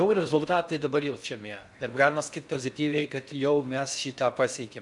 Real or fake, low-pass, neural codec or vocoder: fake; 10.8 kHz; codec, 16 kHz in and 24 kHz out, 0.6 kbps, FocalCodec, streaming, 4096 codes